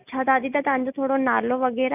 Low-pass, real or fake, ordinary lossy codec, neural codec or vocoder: 3.6 kHz; real; none; none